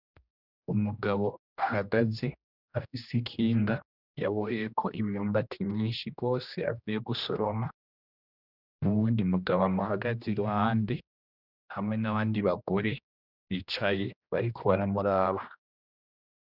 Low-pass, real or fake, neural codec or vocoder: 5.4 kHz; fake; codec, 16 kHz, 1 kbps, X-Codec, HuBERT features, trained on general audio